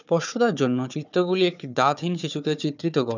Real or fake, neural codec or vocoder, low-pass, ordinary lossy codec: fake; codec, 16 kHz, 4 kbps, FunCodec, trained on Chinese and English, 50 frames a second; 7.2 kHz; none